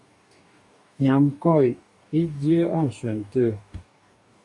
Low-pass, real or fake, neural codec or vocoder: 10.8 kHz; fake; codec, 44.1 kHz, 2.6 kbps, DAC